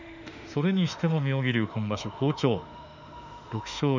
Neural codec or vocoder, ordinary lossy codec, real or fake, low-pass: autoencoder, 48 kHz, 32 numbers a frame, DAC-VAE, trained on Japanese speech; none; fake; 7.2 kHz